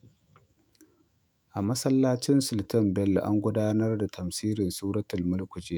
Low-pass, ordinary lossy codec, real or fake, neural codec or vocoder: none; none; fake; autoencoder, 48 kHz, 128 numbers a frame, DAC-VAE, trained on Japanese speech